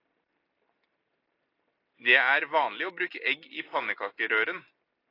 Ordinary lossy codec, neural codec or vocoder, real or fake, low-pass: AAC, 32 kbps; none; real; 5.4 kHz